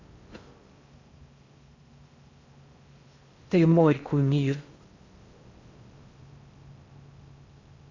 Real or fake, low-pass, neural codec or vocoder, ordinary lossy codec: fake; 7.2 kHz; codec, 16 kHz in and 24 kHz out, 0.6 kbps, FocalCodec, streaming, 4096 codes; Opus, 64 kbps